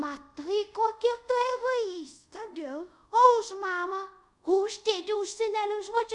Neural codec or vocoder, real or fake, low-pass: codec, 24 kHz, 0.5 kbps, DualCodec; fake; 10.8 kHz